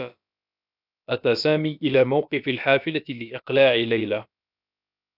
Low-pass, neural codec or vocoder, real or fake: 5.4 kHz; codec, 16 kHz, about 1 kbps, DyCAST, with the encoder's durations; fake